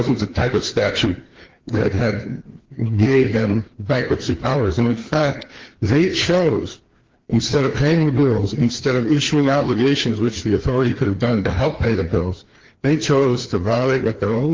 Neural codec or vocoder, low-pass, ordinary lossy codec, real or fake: codec, 16 kHz, 2 kbps, FreqCodec, larger model; 7.2 kHz; Opus, 16 kbps; fake